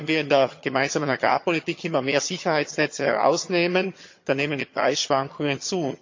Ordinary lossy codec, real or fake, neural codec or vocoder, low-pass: MP3, 48 kbps; fake; vocoder, 22.05 kHz, 80 mel bands, HiFi-GAN; 7.2 kHz